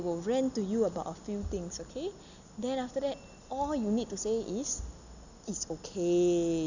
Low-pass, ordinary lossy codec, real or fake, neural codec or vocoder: 7.2 kHz; none; real; none